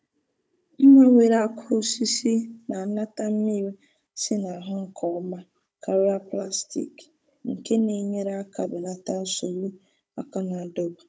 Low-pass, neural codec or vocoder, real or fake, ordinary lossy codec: none; codec, 16 kHz, 16 kbps, FunCodec, trained on Chinese and English, 50 frames a second; fake; none